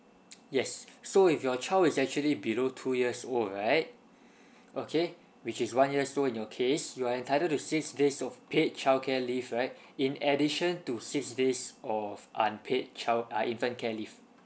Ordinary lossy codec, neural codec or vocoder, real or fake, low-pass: none; none; real; none